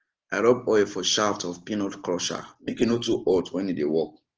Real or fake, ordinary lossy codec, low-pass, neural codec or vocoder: real; Opus, 16 kbps; 7.2 kHz; none